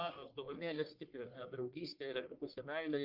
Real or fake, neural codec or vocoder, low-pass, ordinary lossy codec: fake; codec, 44.1 kHz, 1.7 kbps, Pupu-Codec; 5.4 kHz; Opus, 32 kbps